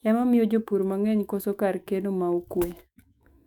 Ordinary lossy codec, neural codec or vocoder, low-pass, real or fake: none; autoencoder, 48 kHz, 128 numbers a frame, DAC-VAE, trained on Japanese speech; 19.8 kHz; fake